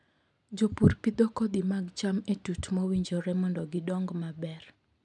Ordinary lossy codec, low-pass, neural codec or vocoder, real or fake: none; 10.8 kHz; none; real